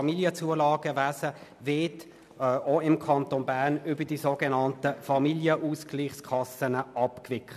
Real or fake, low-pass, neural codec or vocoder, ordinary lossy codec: real; 14.4 kHz; none; none